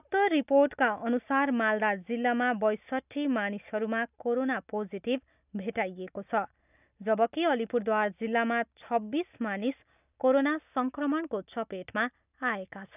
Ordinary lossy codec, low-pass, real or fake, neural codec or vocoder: none; 3.6 kHz; real; none